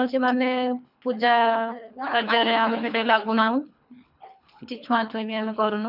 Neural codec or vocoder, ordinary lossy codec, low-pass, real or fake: codec, 24 kHz, 3 kbps, HILCodec; none; 5.4 kHz; fake